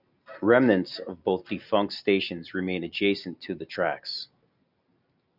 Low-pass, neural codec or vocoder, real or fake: 5.4 kHz; none; real